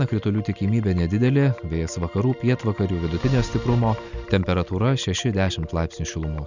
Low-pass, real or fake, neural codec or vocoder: 7.2 kHz; real; none